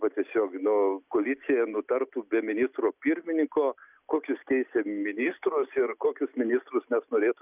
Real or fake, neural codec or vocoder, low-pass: real; none; 3.6 kHz